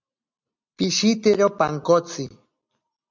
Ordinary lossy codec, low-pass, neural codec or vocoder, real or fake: MP3, 64 kbps; 7.2 kHz; none; real